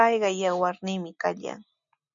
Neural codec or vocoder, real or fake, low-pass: none; real; 7.2 kHz